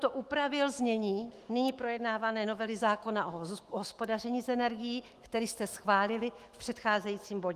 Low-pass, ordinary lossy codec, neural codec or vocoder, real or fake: 14.4 kHz; Opus, 24 kbps; autoencoder, 48 kHz, 128 numbers a frame, DAC-VAE, trained on Japanese speech; fake